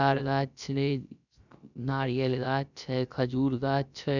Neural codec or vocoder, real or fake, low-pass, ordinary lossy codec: codec, 16 kHz, 0.3 kbps, FocalCodec; fake; 7.2 kHz; none